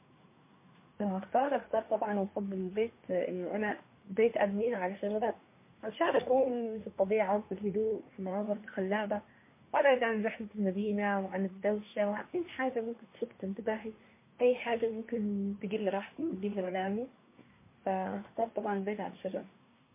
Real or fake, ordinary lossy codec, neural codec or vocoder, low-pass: fake; MP3, 24 kbps; codec, 24 kHz, 1 kbps, SNAC; 3.6 kHz